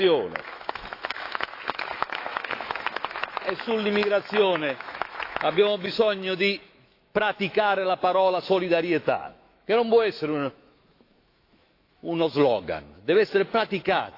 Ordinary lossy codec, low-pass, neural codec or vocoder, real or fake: AAC, 32 kbps; 5.4 kHz; autoencoder, 48 kHz, 128 numbers a frame, DAC-VAE, trained on Japanese speech; fake